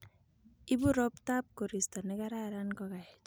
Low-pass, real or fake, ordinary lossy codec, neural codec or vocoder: none; real; none; none